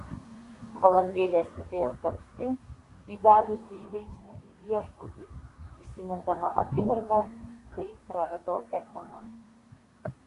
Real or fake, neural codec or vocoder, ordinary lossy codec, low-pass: fake; codec, 24 kHz, 1 kbps, SNAC; AAC, 48 kbps; 10.8 kHz